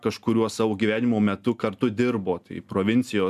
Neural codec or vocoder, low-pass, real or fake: none; 14.4 kHz; real